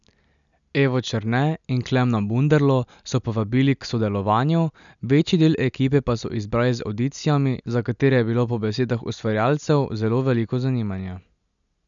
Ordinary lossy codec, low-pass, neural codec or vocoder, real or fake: none; 7.2 kHz; none; real